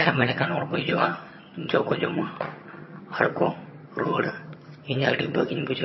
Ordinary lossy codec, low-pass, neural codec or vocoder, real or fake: MP3, 24 kbps; 7.2 kHz; vocoder, 22.05 kHz, 80 mel bands, HiFi-GAN; fake